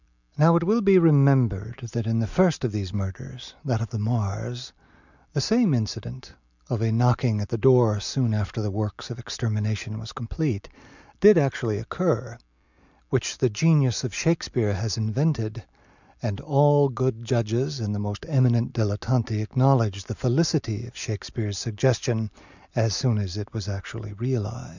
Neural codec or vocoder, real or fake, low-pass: none; real; 7.2 kHz